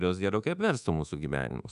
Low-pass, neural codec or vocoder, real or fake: 10.8 kHz; codec, 24 kHz, 1.2 kbps, DualCodec; fake